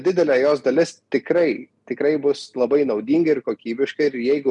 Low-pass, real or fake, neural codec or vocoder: 10.8 kHz; real; none